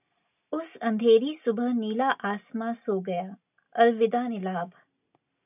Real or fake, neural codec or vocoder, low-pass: real; none; 3.6 kHz